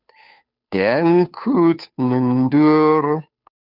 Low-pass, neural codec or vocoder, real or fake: 5.4 kHz; codec, 16 kHz, 2 kbps, FunCodec, trained on Chinese and English, 25 frames a second; fake